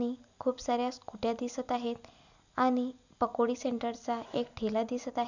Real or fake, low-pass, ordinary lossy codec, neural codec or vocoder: real; 7.2 kHz; none; none